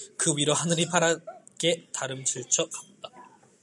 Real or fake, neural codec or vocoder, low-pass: real; none; 10.8 kHz